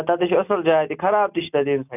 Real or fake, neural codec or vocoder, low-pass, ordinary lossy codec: real; none; 3.6 kHz; none